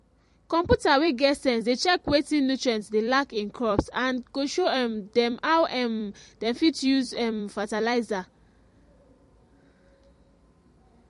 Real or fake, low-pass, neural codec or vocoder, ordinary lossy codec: fake; 14.4 kHz; vocoder, 44.1 kHz, 128 mel bands every 256 samples, BigVGAN v2; MP3, 48 kbps